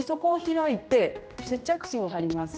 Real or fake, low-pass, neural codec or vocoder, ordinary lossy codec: fake; none; codec, 16 kHz, 1 kbps, X-Codec, HuBERT features, trained on general audio; none